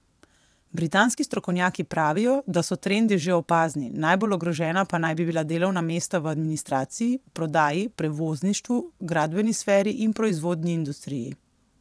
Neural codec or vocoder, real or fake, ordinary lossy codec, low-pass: vocoder, 22.05 kHz, 80 mel bands, WaveNeXt; fake; none; none